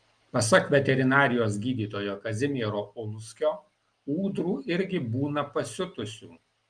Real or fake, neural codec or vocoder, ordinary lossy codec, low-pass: real; none; Opus, 32 kbps; 9.9 kHz